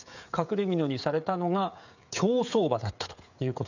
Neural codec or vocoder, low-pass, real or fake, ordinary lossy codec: codec, 16 kHz, 16 kbps, FreqCodec, smaller model; 7.2 kHz; fake; none